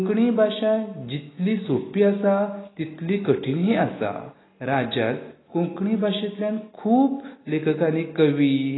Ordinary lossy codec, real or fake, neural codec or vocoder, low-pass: AAC, 16 kbps; real; none; 7.2 kHz